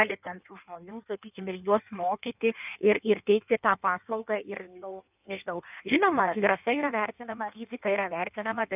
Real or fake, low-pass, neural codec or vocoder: fake; 3.6 kHz; codec, 16 kHz in and 24 kHz out, 1.1 kbps, FireRedTTS-2 codec